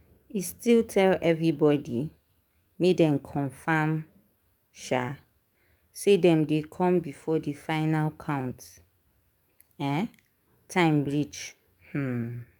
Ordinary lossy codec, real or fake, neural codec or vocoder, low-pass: none; fake; codec, 44.1 kHz, 7.8 kbps, DAC; 19.8 kHz